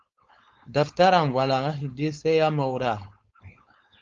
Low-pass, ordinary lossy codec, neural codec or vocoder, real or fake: 7.2 kHz; Opus, 32 kbps; codec, 16 kHz, 4.8 kbps, FACodec; fake